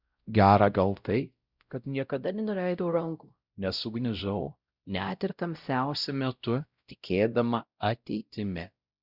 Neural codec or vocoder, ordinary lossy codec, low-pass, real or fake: codec, 16 kHz, 0.5 kbps, X-Codec, WavLM features, trained on Multilingual LibriSpeech; Opus, 64 kbps; 5.4 kHz; fake